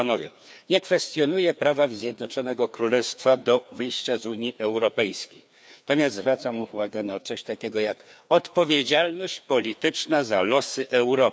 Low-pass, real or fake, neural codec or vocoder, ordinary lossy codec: none; fake; codec, 16 kHz, 2 kbps, FreqCodec, larger model; none